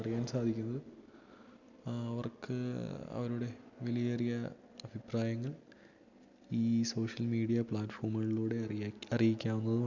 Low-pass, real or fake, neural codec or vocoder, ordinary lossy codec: 7.2 kHz; real; none; none